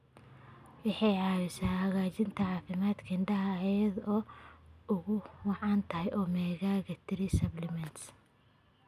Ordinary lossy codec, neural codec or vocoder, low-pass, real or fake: none; none; 14.4 kHz; real